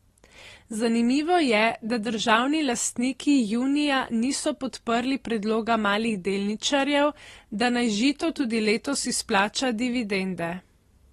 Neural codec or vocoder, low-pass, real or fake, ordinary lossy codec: none; 19.8 kHz; real; AAC, 32 kbps